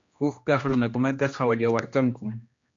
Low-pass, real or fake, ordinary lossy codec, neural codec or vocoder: 7.2 kHz; fake; AAC, 48 kbps; codec, 16 kHz, 1 kbps, X-Codec, HuBERT features, trained on general audio